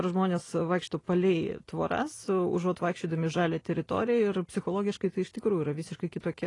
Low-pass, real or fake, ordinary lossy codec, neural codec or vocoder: 10.8 kHz; real; AAC, 32 kbps; none